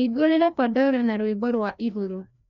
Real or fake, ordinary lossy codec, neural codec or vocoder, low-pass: fake; none; codec, 16 kHz, 1 kbps, FreqCodec, larger model; 7.2 kHz